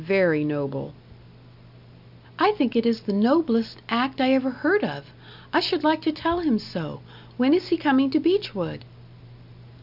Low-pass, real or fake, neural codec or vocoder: 5.4 kHz; real; none